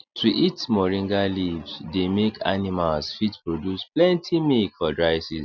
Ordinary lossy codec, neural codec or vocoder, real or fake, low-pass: none; none; real; 7.2 kHz